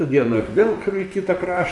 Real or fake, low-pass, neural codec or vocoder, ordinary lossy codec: fake; 10.8 kHz; vocoder, 44.1 kHz, 128 mel bands, Pupu-Vocoder; AAC, 64 kbps